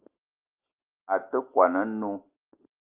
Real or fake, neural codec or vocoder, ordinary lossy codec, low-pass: real; none; Opus, 32 kbps; 3.6 kHz